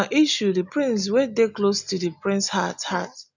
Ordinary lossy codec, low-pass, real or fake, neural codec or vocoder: none; 7.2 kHz; real; none